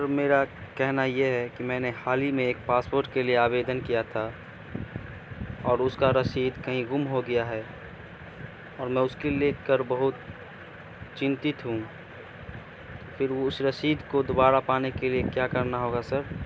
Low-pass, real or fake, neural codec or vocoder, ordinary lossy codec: none; real; none; none